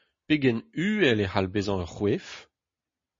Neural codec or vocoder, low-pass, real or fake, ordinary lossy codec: none; 7.2 kHz; real; MP3, 32 kbps